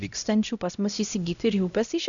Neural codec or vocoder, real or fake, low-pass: codec, 16 kHz, 0.5 kbps, X-Codec, HuBERT features, trained on LibriSpeech; fake; 7.2 kHz